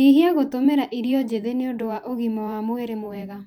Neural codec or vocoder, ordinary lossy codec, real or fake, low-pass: vocoder, 44.1 kHz, 128 mel bands every 512 samples, BigVGAN v2; none; fake; 19.8 kHz